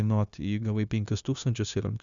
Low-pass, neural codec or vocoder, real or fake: 7.2 kHz; codec, 16 kHz, 0.9 kbps, LongCat-Audio-Codec; fake